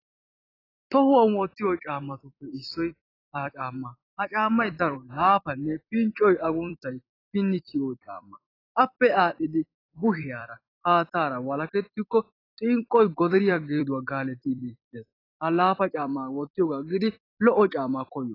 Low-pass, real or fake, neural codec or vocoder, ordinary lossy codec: 5.4 kHz; fake; vocoder, 44.1 kHz, 128 mel bands every 256 samples, BigVGAN v2; AAC, 24 kbps